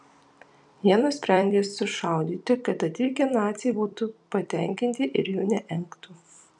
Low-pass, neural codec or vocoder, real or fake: 10.8 kHz; vocoder, 44.1 kHz, 128 mel bands every 256 samples, BigVGAN v2; fake